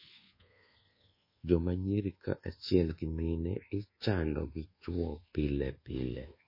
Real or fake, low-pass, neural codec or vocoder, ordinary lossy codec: fake; 5.4 kHz; codec, 24 kHz, 1.2 kbps, DualCodec; MP3, 24 kbps